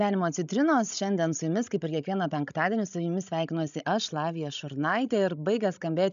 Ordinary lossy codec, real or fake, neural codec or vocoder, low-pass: MP3, 96 kbps; fake; codec, 16 kHz, 16 kbps, FreqCodec, larger model; 7.2 kHz